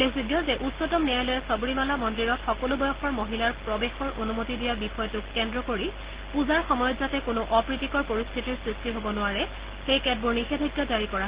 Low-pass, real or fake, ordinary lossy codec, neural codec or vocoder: 3.6 kHz; real; Opus, 16 kbps; none